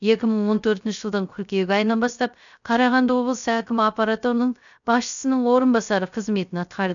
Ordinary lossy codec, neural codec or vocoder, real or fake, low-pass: none; codec, 16 kHz, 0.3 kbps, FocalCodec; fake; 7.2 kHz